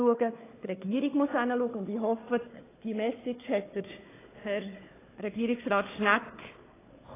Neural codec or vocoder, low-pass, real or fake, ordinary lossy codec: codec, 16 kHz, 4 kbps, FunCodec, trained on Chinese and English, 50 frames a second; 3.6 kHz; fake; AAC, 16 kbps